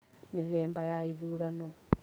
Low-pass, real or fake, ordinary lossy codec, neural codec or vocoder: none; fake; none; codec, 44.1 kHz, 2.6 kbps, SNAC